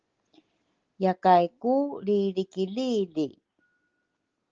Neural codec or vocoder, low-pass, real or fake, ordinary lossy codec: none; 7.2 kHz; real; Opus, 16 kbps